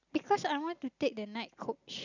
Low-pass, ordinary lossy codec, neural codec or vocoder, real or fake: 7.2 kHz; none; none; real